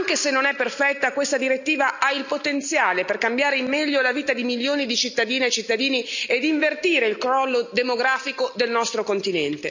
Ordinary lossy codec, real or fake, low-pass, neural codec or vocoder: none; real; 7.2 kHz; none